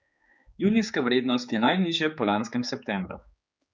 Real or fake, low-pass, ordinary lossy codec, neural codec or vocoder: fake; none; none; codec, 16 kHz, 4 kbps, X-Codec, HuBERT features, trained on general audio